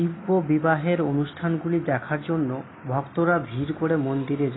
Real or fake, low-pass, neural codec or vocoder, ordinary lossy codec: real; 7.2 kHz; none; AAC, 16 kbps